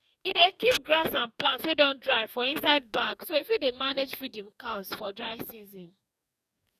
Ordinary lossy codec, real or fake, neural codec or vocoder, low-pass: none; fake; codec, 44.1 kHz, 2.6 kbps, DAC; 14.4 kHz